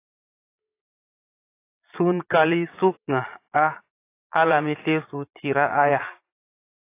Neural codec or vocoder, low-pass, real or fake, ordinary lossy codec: vocoder, 22.05 kHz, 80 mel bands, Vocos; 3.6 kHz; fake; AAC, 24 kbps